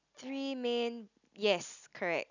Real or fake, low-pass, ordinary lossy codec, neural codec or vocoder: real; 7.2 kHz; none; none